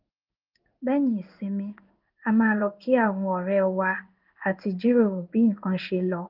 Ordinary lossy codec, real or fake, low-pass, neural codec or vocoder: Opus, 32 kbps; fake; 5.4 kHz; codec, 16 kHz in and 24 kHz out, 1 kbps, XY-Tokenizer